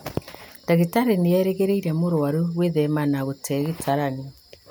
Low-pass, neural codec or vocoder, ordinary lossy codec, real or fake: none; none; none; real